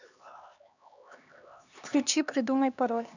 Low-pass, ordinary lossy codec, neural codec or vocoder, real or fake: 7.2 kHz; none; codec, 16 kHz, 2 kbps, X-Codec, HuBERT features, trained on LibriSpeech; fake